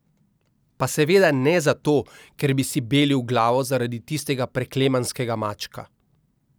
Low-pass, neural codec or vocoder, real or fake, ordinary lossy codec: none; none; real; none